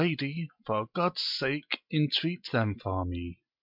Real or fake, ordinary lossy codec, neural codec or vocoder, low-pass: real; MP3, 48 kbps; none; 5.4 kHz